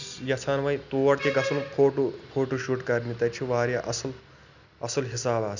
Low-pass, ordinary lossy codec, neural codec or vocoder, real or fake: 7.2 kHz; none; none; real